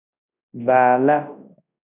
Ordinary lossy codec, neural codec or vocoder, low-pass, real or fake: AAC, 24 kbps; codec, 24 kHz, 0.9 kbps, WavTokenizer, large speech release; 3.6 kHz; fake